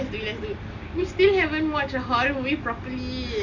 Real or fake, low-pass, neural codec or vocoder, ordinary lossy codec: real; 7.2 kHz; none; none